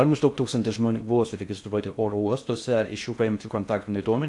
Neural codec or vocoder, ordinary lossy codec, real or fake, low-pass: codec, 16 kHz in and 24 kHz out, 0.6 kbps, FocalCodec, streaming, 2048 codes; AAC, 64 kbps; fake; 10.8 kHz